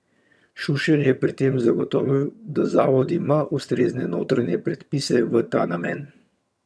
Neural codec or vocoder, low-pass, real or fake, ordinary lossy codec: vocoder, 22.05 kHz, 80 mel bands, HiFi-GAN; none; fake; none